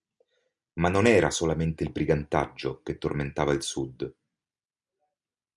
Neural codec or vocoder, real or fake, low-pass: none; real; 10.8 kHz